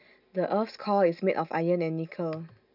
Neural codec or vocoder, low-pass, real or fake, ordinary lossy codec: none; 5.4 kHz; real; none